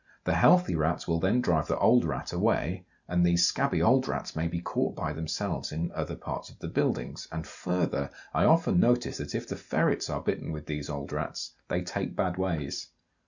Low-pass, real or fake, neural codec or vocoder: 7.2 kHz; real; none